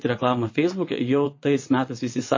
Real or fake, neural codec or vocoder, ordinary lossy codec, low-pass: fake; vocoder, 22.05 kHz, 80 mel bands, WaveNeXt; MP3, 32 kbps; 7.2 kHz